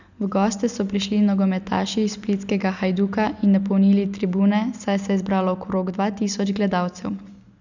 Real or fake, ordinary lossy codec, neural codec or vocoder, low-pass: real; none; none; 7.2 kHz